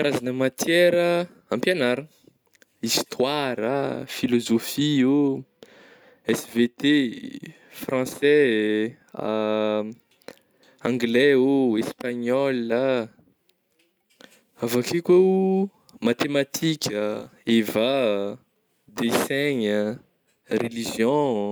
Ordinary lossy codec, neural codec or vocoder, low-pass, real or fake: none; none; none; real